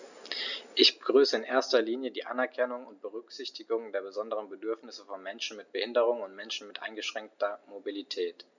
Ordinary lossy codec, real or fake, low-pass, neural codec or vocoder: none; real; 7.2 kHz; none